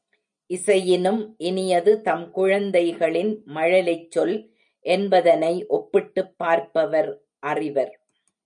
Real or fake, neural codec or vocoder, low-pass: real; none; 9.9 kHz